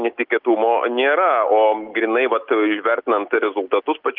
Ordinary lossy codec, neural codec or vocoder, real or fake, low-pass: Opus, 32 kbps; none; real; 5.4 kHz